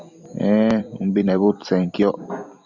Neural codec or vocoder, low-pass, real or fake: vocoder, 44.1 kHz, 128 mel bands every 256 samples, BigVGAN v2; 7.2 kHz; fake